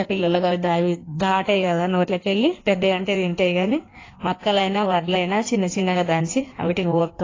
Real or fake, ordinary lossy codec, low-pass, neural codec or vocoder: fake; AAC, 32 kbps; 7.2 kHz; codec, 16 kHz in and 24 kHz out, 1.1 kbps, FireRedTTS-2 codec